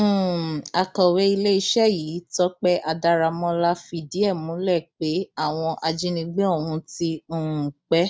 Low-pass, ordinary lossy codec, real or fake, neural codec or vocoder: none; none; real; none